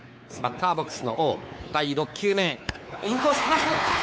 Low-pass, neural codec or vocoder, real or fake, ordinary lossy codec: none; codec, 16 kHz, 4 kbps, X-Codec, WavLM features, trained on Multilingual LibriSpeech; fake; none